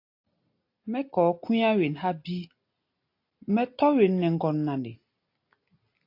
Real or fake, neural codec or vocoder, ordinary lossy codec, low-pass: real; none; AAC, 32 kbps; 5.4 kHz